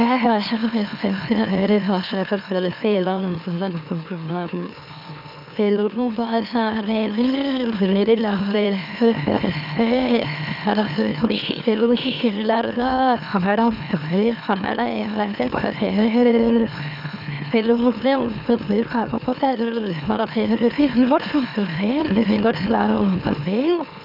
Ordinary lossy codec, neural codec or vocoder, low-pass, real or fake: none; autoencoder, 44.1 kHz, a latent of 192 numbers a frame, MeloTTS; 5.4 kHz; fake